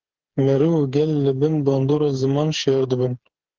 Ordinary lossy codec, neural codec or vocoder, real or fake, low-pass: Opus, 16 kbps; codec, 16 kHz, 4 kbps, FreqCodec, smaller model; fake; 7.2 kHz